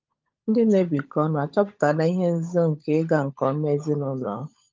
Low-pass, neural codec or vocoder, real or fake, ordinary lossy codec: 7.2 kHz; codec, 16 kHz, 16 kbps, FunCodec, trained on LibriTTS, 50 frames a second; fake; Opus, 24 kbps